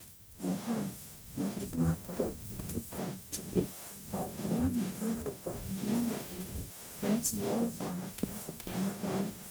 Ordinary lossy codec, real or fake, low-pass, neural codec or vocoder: none; fake; none; codec, 44.1 kHz, 0.9 kbps, DAC